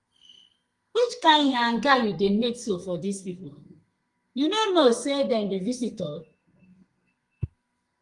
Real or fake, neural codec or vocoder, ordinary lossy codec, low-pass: fake; codec, 32 kHz, 1.9 kbps, SNAC; Opus, 24 kbps; 10.8 kHz